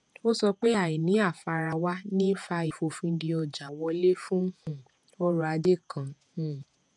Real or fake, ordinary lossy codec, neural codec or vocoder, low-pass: fake; none; vocoder, 48 kHz, 128 mel bands, Vocos; 10.8 kHz